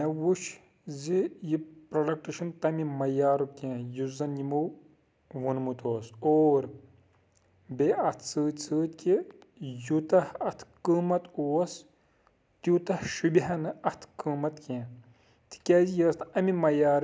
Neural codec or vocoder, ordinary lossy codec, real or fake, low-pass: none; none; real; none